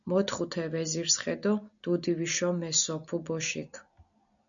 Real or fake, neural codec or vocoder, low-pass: real; none; 7.2 kHz